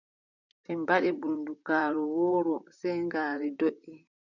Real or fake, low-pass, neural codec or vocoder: fake; 7.2 kHz; vocoder, 44.1 kHz, 128 mel bands, Pupu-Vocoder